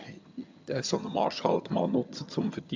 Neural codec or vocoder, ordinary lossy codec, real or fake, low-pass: vocoder, 22.05 kHz, 80 mel bands, HiFi-GAN; MP3, 64 kbps; fake; 7.2 kHz